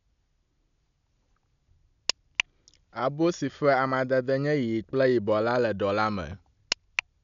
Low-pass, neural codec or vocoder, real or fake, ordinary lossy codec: 7.2 kHz; none; real; none